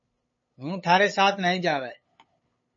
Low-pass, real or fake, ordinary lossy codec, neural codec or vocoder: 7.2 kHz; fake; MP3, 32 kbps; codec, 16 kHz, 8 kbps, FunCodec, trained on LibriTTS, 25 frames a second